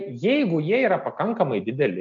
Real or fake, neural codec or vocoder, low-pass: real; none; 7.2 kHz